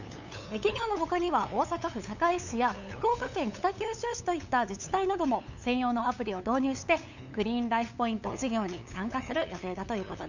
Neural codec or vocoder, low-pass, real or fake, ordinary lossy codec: codec, 16 kHz, 8 kbps, FunCodec, trained on LibriTTS, 25 frames a second; 7.2 kHz; fake; none